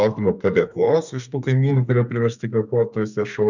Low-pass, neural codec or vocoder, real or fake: 7.2 kHz; codec, 32 kHz, 1.9 kbps, SNAC; fake